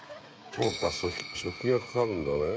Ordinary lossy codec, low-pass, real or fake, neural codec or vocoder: none; none; fake; codec, 16 kHz, 16 kbps, FreqCodec, smaller model